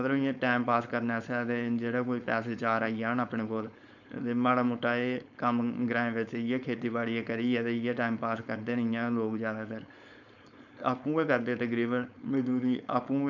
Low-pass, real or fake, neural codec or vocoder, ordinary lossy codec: 7.2 kHz; fake; codec, 16 kHz, 4.8 kbps, FACodec; none